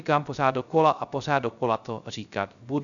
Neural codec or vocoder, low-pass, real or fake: codec, 16 kHz, 0.3 kbps, FocalCodec; 7.2 kHz; fake